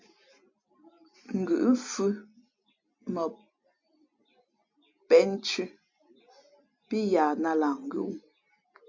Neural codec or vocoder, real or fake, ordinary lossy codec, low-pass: none; real; MP3, 48 kbps; 7.2 kHz